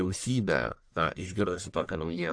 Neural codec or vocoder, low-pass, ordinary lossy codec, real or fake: codec, 44.1 kHz, 1.7 kbps, Pupu-Codec; 9.9 kHz; AAC, 64 kbps; fake